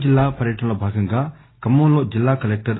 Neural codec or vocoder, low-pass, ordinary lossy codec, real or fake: none; 7.2 kHz; AAC, 16 kbps; real